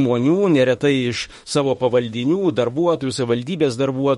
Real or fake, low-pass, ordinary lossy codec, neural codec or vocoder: fake; 19.8 kHz; MP3, 48 kbps; autoencoder, 48 kHz, 32 numbers a frame, DAC-VAE, trained on Japanese speech